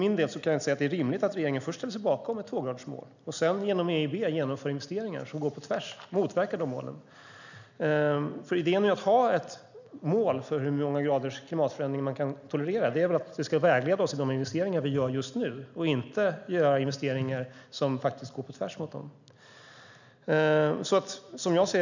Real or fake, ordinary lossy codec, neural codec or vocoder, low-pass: real; none; none; 7.2 kHz